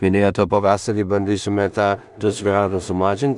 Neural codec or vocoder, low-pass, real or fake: codec, 16 kHz in and 24 kHz out, 0.4 kbps, LongCat-Audio-Codec, two codebook decoder; 10.8 kHz; fake